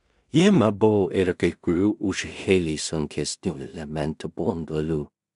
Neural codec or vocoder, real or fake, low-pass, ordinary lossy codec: codec, 16 kHz in and 24 kHz out, 0.4 kbps, LongCat-Audio-Codec, two codebook decoder; fake; 10.8 kHz; MP3, 96 kbps